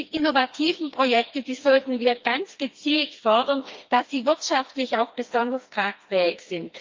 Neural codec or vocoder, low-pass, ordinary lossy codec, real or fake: codec, 16 kHz, 2 kbps, FreqCodec, smaller model; 7.2 kHz; Opus, 24 kbps; fake